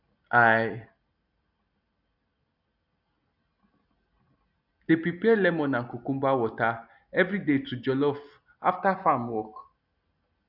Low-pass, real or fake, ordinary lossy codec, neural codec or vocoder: 5.4 kHz; real; none; none